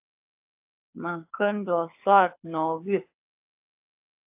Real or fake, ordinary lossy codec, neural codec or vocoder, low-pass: fake; AAC, 32 kbps; codec, 24 kHz, 6 kbps, HILCodec; 3.6 kHz